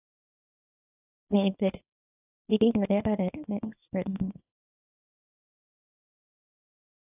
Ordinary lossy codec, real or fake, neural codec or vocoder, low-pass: AAC, 24 kbps; fake; codec, 24 kHz, 0.9 kbps, WavTokenizer, small release; 3.6 kHz